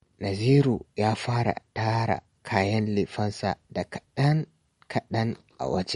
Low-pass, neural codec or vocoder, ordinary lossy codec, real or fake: 19.8 kHz; none; MP3, 48 kbps; real